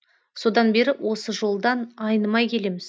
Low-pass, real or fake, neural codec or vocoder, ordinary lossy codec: none; real; none; none